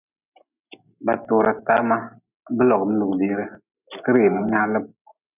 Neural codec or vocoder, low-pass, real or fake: none; 3.6 kHz; real